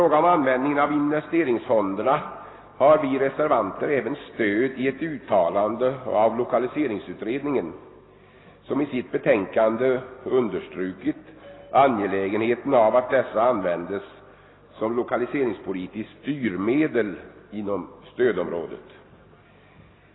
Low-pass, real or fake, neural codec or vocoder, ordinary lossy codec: 7.2 kHz; real; none; AAC, 16 kbps